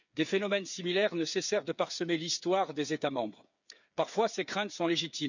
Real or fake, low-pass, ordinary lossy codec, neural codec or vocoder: fake; 7.2 kHz; none; codec, 16 kHz, 8 kbps, FreqCodec, smaller model